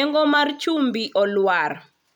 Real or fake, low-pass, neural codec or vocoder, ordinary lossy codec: real; 19.8 kHz; none; none